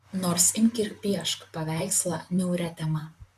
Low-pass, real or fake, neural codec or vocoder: 14.4 kHz; fake; vocoder, 44.1 kHz, 128 mel bands, Pupu-Vocoder